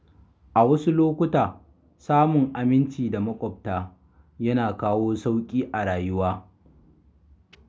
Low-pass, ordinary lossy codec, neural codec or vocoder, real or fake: none; none; none; real